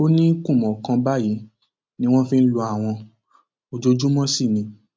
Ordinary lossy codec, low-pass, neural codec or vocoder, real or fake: none; none; none; real